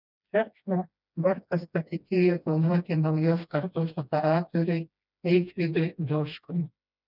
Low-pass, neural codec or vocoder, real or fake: 5.4 kHz; codec, 16 kHz, 1 kbps, FreqCodec, smaller model; fake